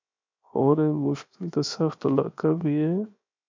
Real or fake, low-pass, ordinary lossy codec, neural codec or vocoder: fake; 7.2 kHz; MP3, 64 kbps; codec, 16 kHz, 0.7 kbps, FocalCodec